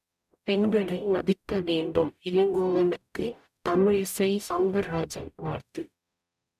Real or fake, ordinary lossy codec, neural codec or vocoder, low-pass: fake; none; codec, 44.1 kHz, 0.9 kbps, DAC; 14.4 kHz